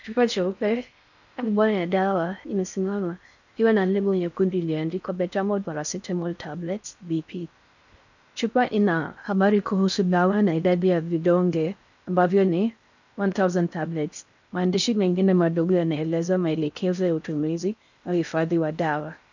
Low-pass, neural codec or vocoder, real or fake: 7.2 kHz; codec, 16 kHz in and 24 kHz out, 0.6 kbps, FocalCodec, streaming, 4096 codes; fake